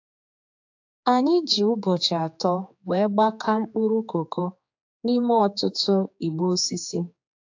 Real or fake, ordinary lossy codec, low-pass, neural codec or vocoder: fake; AAC, 48 kbps; 7.2 kHz; codec, 16 kHz, 4 kbps, X-Codec, HuBERT features, trained on general audio